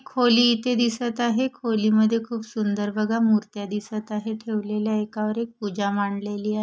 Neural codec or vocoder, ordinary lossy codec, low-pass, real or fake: none; none; none; real